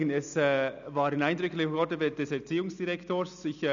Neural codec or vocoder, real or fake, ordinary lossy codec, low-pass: none; real; none; 7.2 kHz